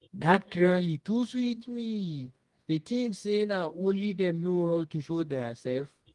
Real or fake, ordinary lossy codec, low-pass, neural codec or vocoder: fake; Opus, 24 kbps; 10.8 kHz; codec, 24 kHz, 0.9 kbps, WavTokenizer, medium music audio release